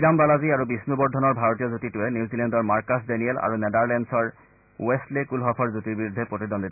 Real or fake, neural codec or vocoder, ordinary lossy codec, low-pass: real; none; none; 3.6 kHz